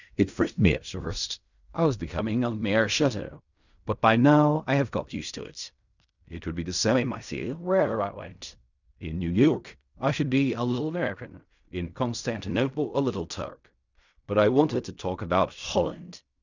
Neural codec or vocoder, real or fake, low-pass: codec, 16 kHz in and 24 kHz out, 0.4 kbps, LongCat-Audio-Codec, fine tuned four codebook decoder; fake; 7.2 kHz